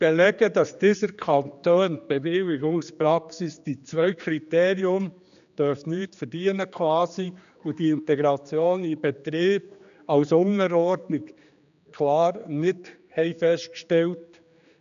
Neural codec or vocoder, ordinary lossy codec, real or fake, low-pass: codec, 16 kHz, 2 kbps, X-Codec, HuBERT features, trained on general audio; none; fake; 7.2 kHz